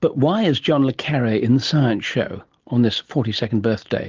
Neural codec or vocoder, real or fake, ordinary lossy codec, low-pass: none; real; Opus, 16 kbps; 7.2 kHz